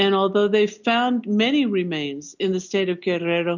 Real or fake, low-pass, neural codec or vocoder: real; 7.2 kHz; none